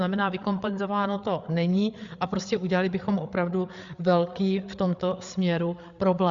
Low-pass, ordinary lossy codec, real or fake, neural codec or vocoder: 7.2 kHz; Opus, 64 kbps; fake; codec, 16 kHz, 8 kbps, FreqCodec, larger model